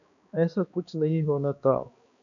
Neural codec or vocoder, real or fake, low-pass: codec, 16 kHz, 2 kbps, X-Codec, HuBERT features, trained on balanced general audio; fake; 7.2 kHz